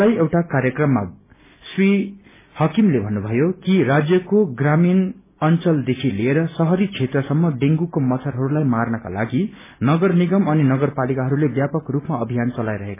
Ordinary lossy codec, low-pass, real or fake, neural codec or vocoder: MP3, 16 kbps; 3.6 kHz; real; none